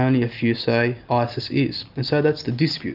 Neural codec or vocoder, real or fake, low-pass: none; real; 5.4 kHz